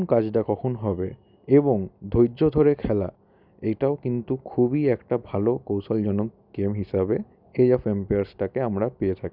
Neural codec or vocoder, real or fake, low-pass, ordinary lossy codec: none; real; 5.4 kHz; none